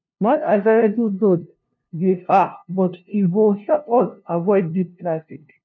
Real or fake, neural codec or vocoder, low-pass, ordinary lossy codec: fake; codec, 16 kHz, 0.5 kbps, FunCodec, trained on LibriTTS, 25 frames a second; 7.2 kHz; MP3, 64 kbps